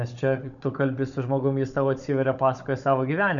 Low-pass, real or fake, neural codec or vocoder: 7.2 kHz; fake; codec, 16 kHz, 16 kbps, FunCodec, trained on Chinese and English, 50 frames a second